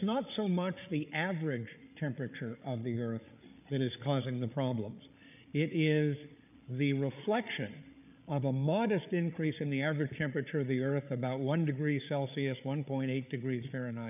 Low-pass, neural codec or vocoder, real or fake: 3.6 kHz; codec, 16 kHz, 8 kbps, FreqCodec, larger model; fake